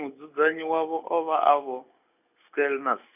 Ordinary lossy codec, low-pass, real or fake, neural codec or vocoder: none; 3.6 kHz; real; none